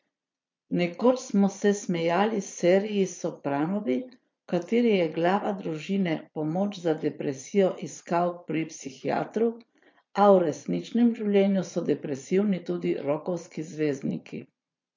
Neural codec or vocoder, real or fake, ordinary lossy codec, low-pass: vocoder, 22.05 kHz, 80 mel bands, Vocos; fake; MP3, 48 kbps; 7.2 kHz